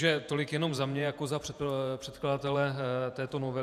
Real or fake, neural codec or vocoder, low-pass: fake; vocoder, 48 kHz, 128 mel bands, Vocos; 14.4 kHz